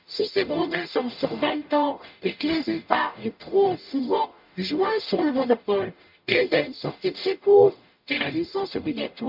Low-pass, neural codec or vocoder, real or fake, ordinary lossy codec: 5.4 kHz; codec, 44.1 kHz, 0.9 kbps, DAC; fake; MP3, 48 kbps